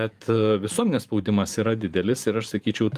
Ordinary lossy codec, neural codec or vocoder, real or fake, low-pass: Opus, 32 kbps; vocoder, 44.1 kHz, 128 mel bands every 512 samples, BigVGAN v2; fake; 14.4 kHz